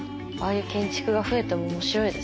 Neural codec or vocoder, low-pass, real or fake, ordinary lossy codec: none; none; real; none